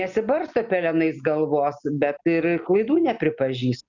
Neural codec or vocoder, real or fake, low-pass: none; real; 7.2 kHz